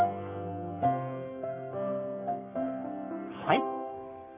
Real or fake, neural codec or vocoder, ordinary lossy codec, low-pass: fake; codec, 44.1 kHz, 3.4 kbps, Pupu-Codec; none; 3.6 kHz